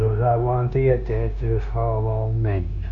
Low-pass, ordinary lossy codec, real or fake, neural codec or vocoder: 7.2 kHz; none; fake; codec, 16 kHz, 0.9 kbps, LongCat-Audio-Codec